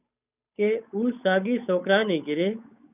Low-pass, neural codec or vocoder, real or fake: 3.6 kHz; codec, 16 kHz, 8 kbps, FunCodec, trained on Chinese and English, 25 frames a second; fake